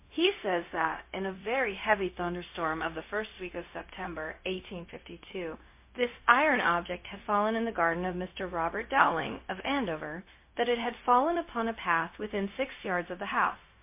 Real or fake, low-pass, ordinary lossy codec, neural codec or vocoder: fake; 3.6 kHz; MP3, 24 kbps; codec, 16 kHz, 0.4 kbps, LongCat-Audio-Codec